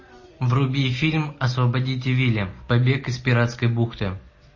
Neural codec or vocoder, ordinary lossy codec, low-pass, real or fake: none; MP3, 32 kbps; 7.2 kHz; real